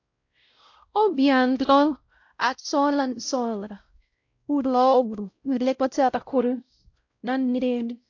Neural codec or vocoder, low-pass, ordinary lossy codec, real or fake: codec, 16 kHz, 0.5 kbps, X-Codec, WavLM features, trained on Multilingual LibriSpeech; 7.2 kHz; AAC, 48 kbps; fake